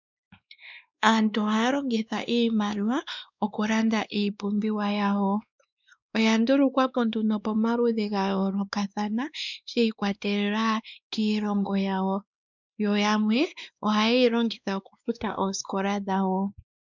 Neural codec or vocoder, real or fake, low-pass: codec, 16 kHz, 2 kbps, X-Codec, WavLM features, trained on Multilingual LibriSpeech; fake; 7.2 kHz